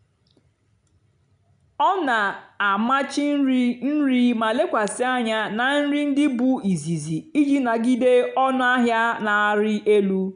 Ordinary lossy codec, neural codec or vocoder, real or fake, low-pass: none; none; real; 9.9 kHz